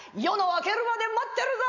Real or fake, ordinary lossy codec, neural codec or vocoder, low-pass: real; none; none; 7.2 kHz